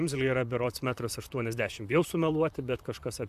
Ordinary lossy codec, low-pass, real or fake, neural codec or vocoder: AAC, 96 kbps; 14.4 kHz; fake; vocoder, 44.1 kHz, 128 mel bands, Pupu-Vocoder